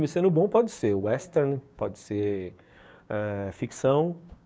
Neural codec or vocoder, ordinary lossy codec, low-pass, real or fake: codec, 16 kHz, 6 kbps, DAC; none; none; fake